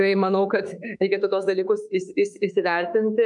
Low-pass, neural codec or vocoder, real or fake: 10.8 kHz; autoencoder, 48 kHz, 32 numbers a frame, DAC-VAE, trained on Japanese speech; fake